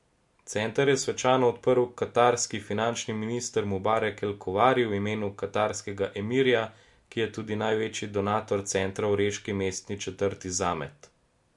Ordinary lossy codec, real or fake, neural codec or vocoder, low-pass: MP3, 64 kbps; real; none; 10.8 kHz